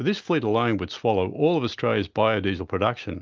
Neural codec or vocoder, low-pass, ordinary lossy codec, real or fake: none; 7.2 kHz; Opus, 24 kbps; real